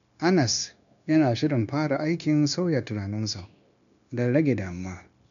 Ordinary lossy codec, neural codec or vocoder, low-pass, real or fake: none; codec, 16 kHz, 0.9 kbps, LongCat-Audio-Codec; 7.2 kHz; fake